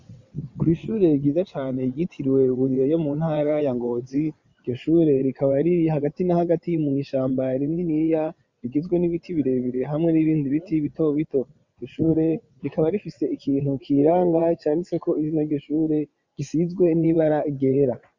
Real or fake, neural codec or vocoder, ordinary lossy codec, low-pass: fake; vocoder, 22.05 kHz, 80 mel bands, WaveNeXt; Opus, 64 kbps; 7.2 kHz